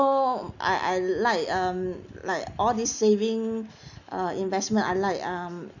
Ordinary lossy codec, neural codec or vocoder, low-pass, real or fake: none; none; 7.2 kHz; real